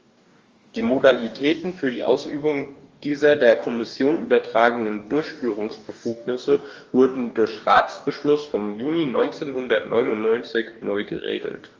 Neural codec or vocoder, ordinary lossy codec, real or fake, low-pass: codec, 44.1 kHz, 2.6 kbps, DAC; Opus, 32 kbps; fake; 7.2 kHz